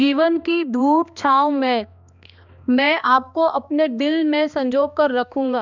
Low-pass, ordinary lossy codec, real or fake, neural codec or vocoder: 7.2 kHz; none; fake; codec, 16 kHz, 2 kbps, X-Codec, HuBERT features, trained on balanced general audio